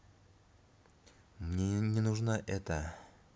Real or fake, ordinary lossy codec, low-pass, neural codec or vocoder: real; none; none; none